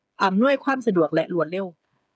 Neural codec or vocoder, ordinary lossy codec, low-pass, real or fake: codec, 16 kHz, 8 kbps, FreqCodec, smaller model; none; none; fake